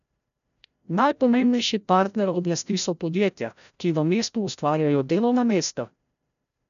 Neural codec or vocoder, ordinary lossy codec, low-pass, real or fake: codec, 16 kHz, 0.5 kbps, FreqCodec, larger model; none; 7.2 kHz; fake